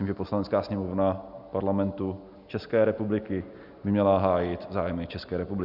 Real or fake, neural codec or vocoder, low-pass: real; none; 5.4 kHz